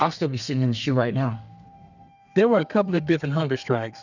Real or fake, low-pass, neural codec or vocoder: fake; 7.2 kHz; codec, 32 kHz, 1.9 kbps, SNAC